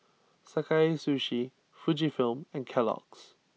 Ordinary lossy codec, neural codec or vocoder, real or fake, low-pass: none; none; real; none